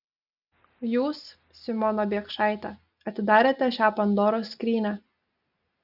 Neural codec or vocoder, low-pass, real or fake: none; 5.4 kHz; real